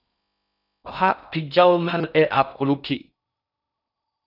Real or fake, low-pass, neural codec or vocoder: fake; 5.4 kHz; codec, 16 kHz in and 24 kHz out, 0.6 kbps, FocalCodec, streaming, 4096 codes